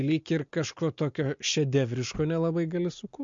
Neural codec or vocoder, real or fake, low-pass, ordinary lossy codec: none; real; 7.2 kHz; MP3, 48 kbps